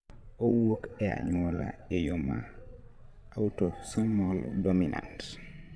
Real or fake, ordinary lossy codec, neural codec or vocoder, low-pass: fake; none; vocoder, 22.05 kHz, 80 mel bands, Vocos; none